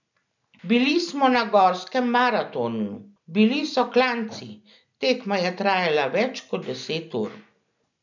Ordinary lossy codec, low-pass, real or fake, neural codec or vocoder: none; 7.2 kHz; real; none